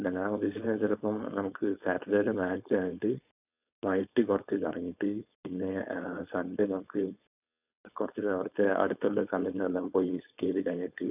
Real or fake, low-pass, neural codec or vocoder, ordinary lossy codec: fake; 3.6 kHz; codec, 16 kHz, 4.8 kbps, FACodec; none